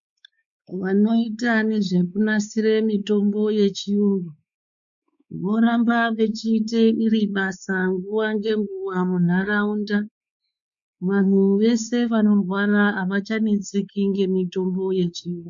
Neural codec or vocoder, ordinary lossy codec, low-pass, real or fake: codec, 16 kHz, 4 kbps, X-Codec, WavLM features, trained on Multilingual LibriSpeech; MP3, 64 kbps; 7.2 kHz; fake